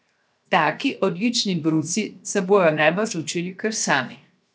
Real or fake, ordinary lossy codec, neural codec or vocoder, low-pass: fake; none; codec, 16 kHz, 0.7 kbps, FocalCodec; none